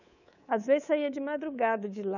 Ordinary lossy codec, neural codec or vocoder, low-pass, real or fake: none; codec, 24 kHz, 3.1 kbps, DualCodec; 7.2 kHz; fake